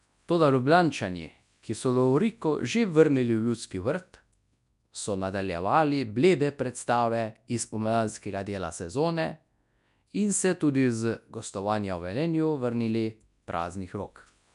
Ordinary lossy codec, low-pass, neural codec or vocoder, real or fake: none; 10.8 kHz; codec, 24 kHz, 0.9 kbps, WavTokenizer, large speech release; fake